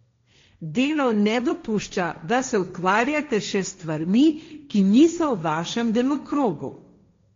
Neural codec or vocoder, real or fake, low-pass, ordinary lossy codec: codec, 16 kHz, 1.1 kbps, Voila-Tokenizer; fake; 7.2 kHz; MP3, 48 kbps